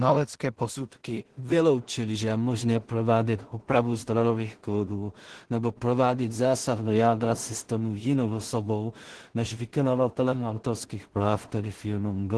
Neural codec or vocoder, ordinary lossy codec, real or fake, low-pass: codec, 16 kHz in and 24 kHz out, 0.4 kbps, LongCat-Audio-Codec, two codebook decoder; Opus, 16 kbps; fake; 10.8 kHz